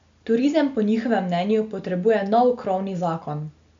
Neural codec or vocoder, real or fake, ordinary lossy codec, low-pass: none; real; MP3, 64 kbps; 7.2 kHz